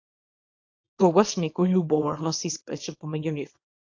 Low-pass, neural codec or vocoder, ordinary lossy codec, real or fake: 7.2 kHz; codec, 24 kHz, 0.9 kbps, WavTokenizer, small release; AAC, 48 kbps; fake